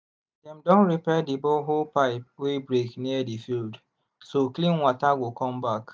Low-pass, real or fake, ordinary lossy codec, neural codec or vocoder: none; real; none; none